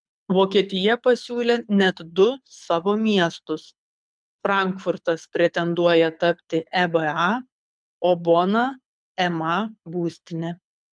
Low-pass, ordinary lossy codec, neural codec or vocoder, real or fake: 9.9 kHz; MP3, 96 kbps; codec, 24 kHz, 6 kbps, HILCodec; fake